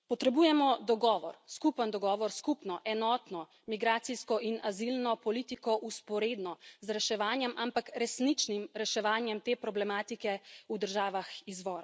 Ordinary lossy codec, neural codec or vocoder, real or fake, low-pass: none; none; real; none